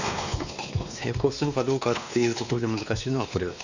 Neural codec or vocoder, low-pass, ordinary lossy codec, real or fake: codec, 16 kHz, 2 kbps, X-Codec, WavLM features, trained on Multilingual LibriSpeech; 7.2 kHz; none; fake